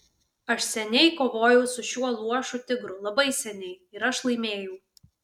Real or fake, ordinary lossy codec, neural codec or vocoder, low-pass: real; MP3, 96 kbps; none; 19.8 kHz